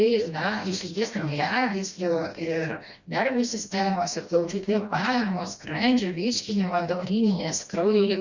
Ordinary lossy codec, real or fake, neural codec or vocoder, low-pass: Opus, 64 kbps; fake; codec, 16 kHz, 1 kbps, FreqCodec, smaller model; 7.2 kHz